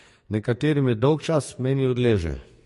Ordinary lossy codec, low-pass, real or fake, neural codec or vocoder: MP3, 48 kbps; 14.4 kHz; fake; codec, 44.1 kHz, 2.6 kbps, SNAC